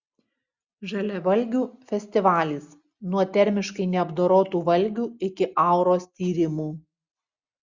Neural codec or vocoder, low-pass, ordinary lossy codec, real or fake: none; 7.2 kHz; Opus, 64 kbps; real